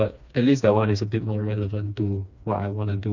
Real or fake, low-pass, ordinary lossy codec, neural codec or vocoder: fake; 7.2 kHz; none; codec, 16 kHz, 2 kbps, FreqCodec, smaller model